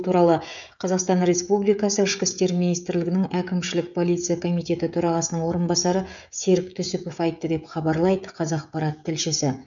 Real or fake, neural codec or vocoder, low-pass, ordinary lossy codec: fake; codec, 16 kHz, 16 kbps, FreqCodec, smaller model; 7.2 kHz; none